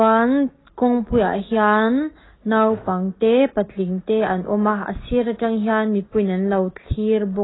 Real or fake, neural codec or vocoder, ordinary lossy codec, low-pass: real; none; AAC, 16 kbps; 7.2 kHz